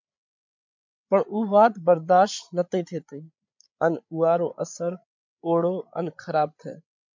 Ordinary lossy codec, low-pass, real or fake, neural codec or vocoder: MP3, 64 kbps; 7.2 kHz; fake; codec, 16 kHz, 8 kbps, FreqCodec, larger model